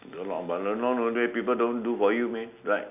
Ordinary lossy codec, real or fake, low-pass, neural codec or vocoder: none; real; 3.6 kHz; none